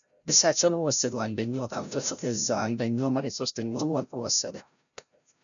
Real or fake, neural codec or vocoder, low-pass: fake; codec, 16 kHz, 0.5 kbps, FreqCodec, larger model; 7.2 kHz